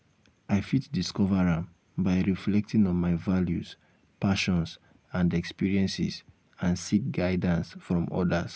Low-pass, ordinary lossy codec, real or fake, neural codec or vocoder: none; none; real; none